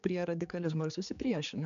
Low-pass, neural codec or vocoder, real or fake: 7.2 kHz; codec, 16 kHz, 4 kbps, X-Codec, HuBERT features, trained on general audio; fake